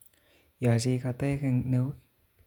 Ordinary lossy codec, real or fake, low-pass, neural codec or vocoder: none; fake; 19.8 kHz; vocoder, 48 kHz, 128 mel bands, Vocos